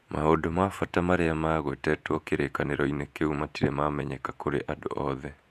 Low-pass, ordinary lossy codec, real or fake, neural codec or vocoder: 14.4 kHz; none; fake; vocoder, 48 kHz, 128 mel bands, Vocos